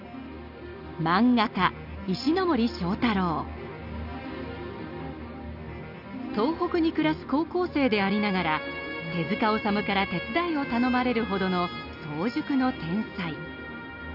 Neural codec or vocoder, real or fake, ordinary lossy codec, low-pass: none; real; none; 5.4 kHz